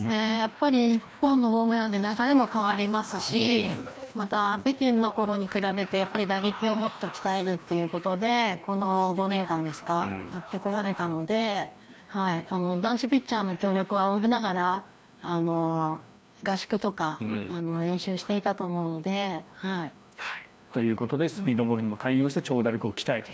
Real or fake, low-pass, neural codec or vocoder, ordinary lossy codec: fake; none; codec, 16 kHz, 1 kbps, FreqCodec, larger model; none